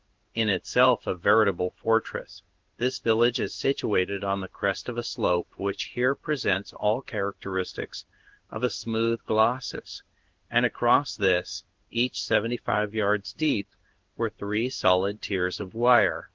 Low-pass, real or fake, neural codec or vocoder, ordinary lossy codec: 7.2 kHz; fake; codec, 16 kHz in and 24 kHz out, 1 kbps, XY-Tokenizer; Opus, 16 kbps